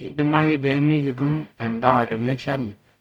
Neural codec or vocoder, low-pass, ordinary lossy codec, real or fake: codec, 44.1 kHz, 0.9 kbps, DAC; 19.8 kHz; none; fake